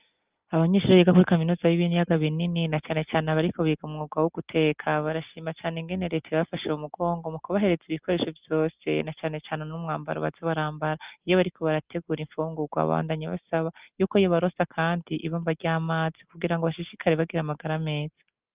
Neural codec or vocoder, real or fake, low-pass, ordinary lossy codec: none; real; 3.6 kHz; Opus, 32 kbps